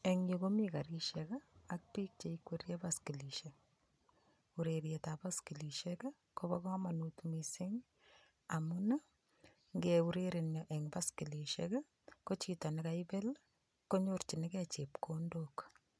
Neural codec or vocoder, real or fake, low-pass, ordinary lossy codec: none; real; none; none